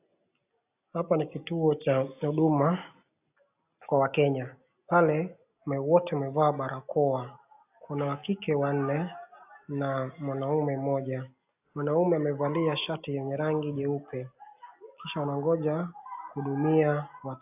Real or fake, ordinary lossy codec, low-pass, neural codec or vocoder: real; AAC, 32 kbps; 3.6 kHz; none